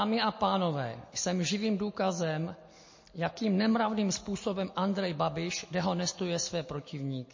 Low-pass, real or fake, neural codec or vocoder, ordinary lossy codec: 7.2 kHz; real; none; MP3, 32 kbps